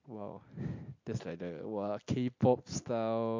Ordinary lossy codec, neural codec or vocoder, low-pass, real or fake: MP3, 48 kbps; none; 7.2 kHz; real